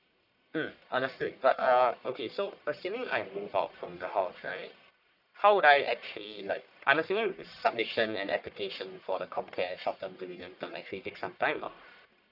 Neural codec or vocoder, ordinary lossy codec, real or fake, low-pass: codec, 44.1 kHz, 1.7 kbps, Pupu-Codec; none; fake; 5.4 kHz